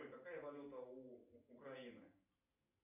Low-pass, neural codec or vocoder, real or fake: 3.6 kHz; none; real